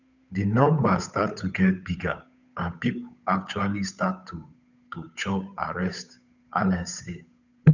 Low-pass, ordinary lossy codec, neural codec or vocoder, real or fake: 7.2 kHz; none; codec, 16 kHz, 8 kbps, FunCodec, trained on Chinese and English, 25 frames a second; fake